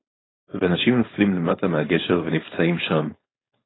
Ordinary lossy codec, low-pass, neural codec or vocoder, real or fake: AAC, 16 kbps; 7.2 kHz; codec, 16 kHz, 4.8 kbps, FACodec; fake